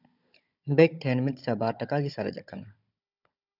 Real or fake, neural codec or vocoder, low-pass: fake; codec, 16 kHz, 16 kbps, FunCodec, trained on Chinese and English, 50 frames a second; 5.4 kHz